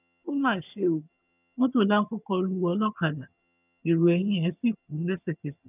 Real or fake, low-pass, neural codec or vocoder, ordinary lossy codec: fake; 3.6 kHz; vocoder, 22.05 kHz, 80 mel bands, HiFi-GAN; none